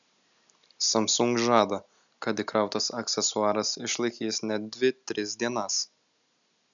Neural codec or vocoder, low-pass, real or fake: none; 7.2 kHz; real